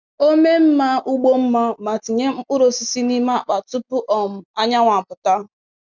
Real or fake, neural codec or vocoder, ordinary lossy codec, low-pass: real; none; none; 7.2 kHz